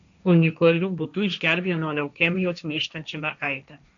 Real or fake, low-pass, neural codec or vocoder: fake; 7.2 kHz; codec, 16 kHz, 1.1 kbps, Voila-Tokenizer